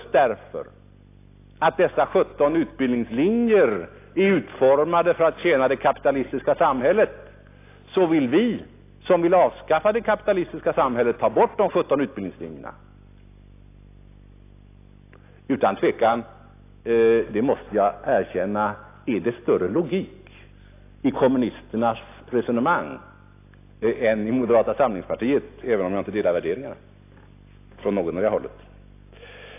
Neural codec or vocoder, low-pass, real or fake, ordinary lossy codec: none; 3.6 kHz; real; AAC, 24 kbps